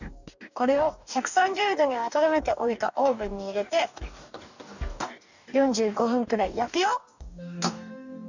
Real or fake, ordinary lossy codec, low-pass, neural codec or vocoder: fake; none; 7.2 kHz; codec, 44.1 kHz, 2.6 kbps, DAC